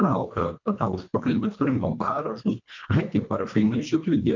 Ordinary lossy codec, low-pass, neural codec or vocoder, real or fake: MP3, 64 kbps; 7.2 kHz; codec, 24 kHz, 1.5 kbps, HILCodec; fake